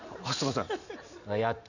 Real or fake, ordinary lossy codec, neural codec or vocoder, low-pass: real; none; none; 7.2 kHz